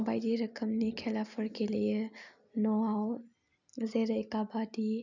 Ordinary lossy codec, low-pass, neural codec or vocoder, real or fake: none; 7.2 kHz; none; real